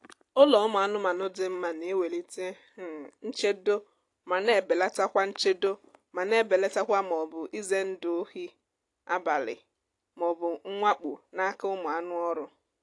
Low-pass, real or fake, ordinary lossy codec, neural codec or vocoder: 10.8 kHz; real; AAC, 48 kbps; none